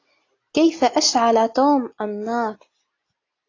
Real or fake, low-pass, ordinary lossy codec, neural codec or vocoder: real; 7.2 kHz; AAC, 32 kbps; none